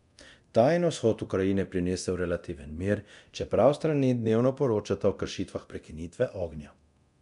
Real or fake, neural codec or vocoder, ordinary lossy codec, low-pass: fake; codec, 24 kHz, 0.9 kbps, DualCodec; none; 10.8 kHz